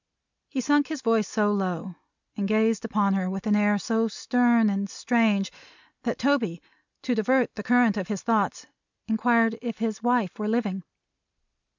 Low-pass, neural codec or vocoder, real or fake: 7.2 kHz; none; real